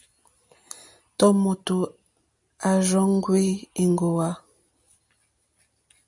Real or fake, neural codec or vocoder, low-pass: real; none; 10.8 kHz